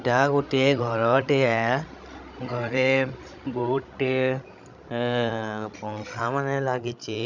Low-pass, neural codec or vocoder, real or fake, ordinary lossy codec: 7.2 kHz; codec, 16 kHz, 16 kbps, FreqCodec, larger model; fake; none